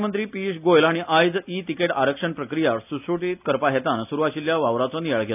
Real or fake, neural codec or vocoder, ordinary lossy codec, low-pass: real; none; none; 3.6 kHz